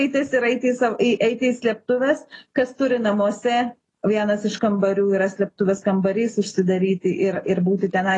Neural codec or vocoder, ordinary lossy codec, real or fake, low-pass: none; AAC, 32 kbps; real; 10.8 kHz